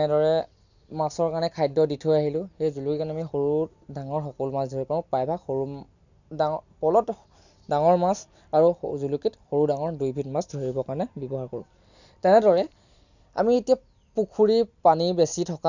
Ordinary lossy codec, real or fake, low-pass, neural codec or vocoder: none; real; 7.2 kHz; none